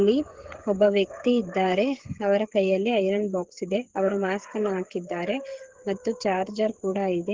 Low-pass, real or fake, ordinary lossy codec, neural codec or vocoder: 7.2 kHz; fake; Opus, 16 kbps; codec, 16 kHz, 8 kbps, FreqCodec, smaller model